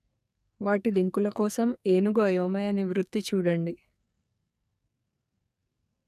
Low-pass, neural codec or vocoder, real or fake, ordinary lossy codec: 14.4 kHz; codec, 44.1 kHz, 2.6 kbps, SNAC; fake; none